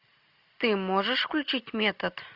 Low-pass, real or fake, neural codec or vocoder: 5.4 kHz; real; none